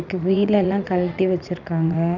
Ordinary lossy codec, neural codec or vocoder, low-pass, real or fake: none; vocoder, 44.1 kHz, 80 mel bands, Vocos; 7.2 kHz; fake